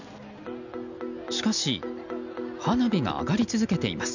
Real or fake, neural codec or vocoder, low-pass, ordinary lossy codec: real; none; 7.2 kHz; none